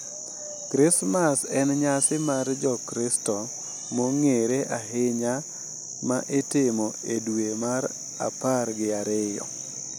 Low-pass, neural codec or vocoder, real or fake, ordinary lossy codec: none; none; real; none